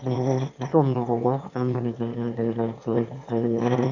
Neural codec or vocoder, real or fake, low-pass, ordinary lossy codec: autoencoder, 22.05 kHz, a latent of 192 numbers a frame, VITS, trained on one speaker; fake; 7.2 kHz; none